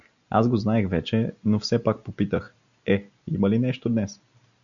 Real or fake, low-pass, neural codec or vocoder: real; 7.2 kHz; none